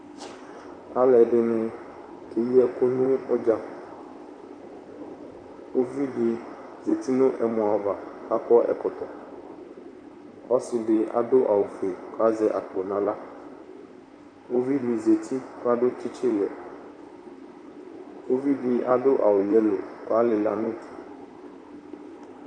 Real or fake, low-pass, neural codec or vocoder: fake; 9.9 kHz; vocoder, 44.1 kHz, 128 mel bands, Pupu-Vocoder